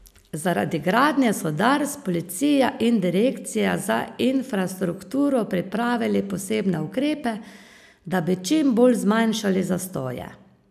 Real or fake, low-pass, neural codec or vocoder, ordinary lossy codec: real; 14.4 kHz; none; none